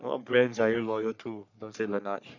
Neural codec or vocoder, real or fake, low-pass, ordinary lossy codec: codec, 44.1 kHz, 3.4 kbps, Pupu-Codec; fake; 7.2 kHz; none